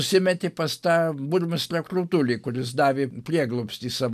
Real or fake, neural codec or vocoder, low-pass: real; none; 14.4 kHz